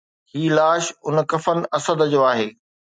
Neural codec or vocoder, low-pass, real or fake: none; 9.9 kHz; real